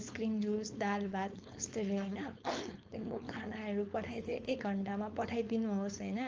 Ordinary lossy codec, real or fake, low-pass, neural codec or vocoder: Opus, 24 kbps; fake; 7.2 kHz; codec, 16 kHz, 4.8 kbps, FACodec